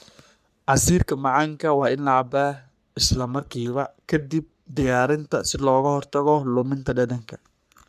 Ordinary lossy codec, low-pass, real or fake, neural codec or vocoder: none; 14.4 kHz; fake; codec, 44.1 kHz, 3.4 kbps, Pupu-Codec